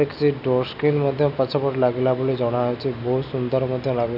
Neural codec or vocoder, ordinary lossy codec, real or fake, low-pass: none; none; real; 5.4 kHz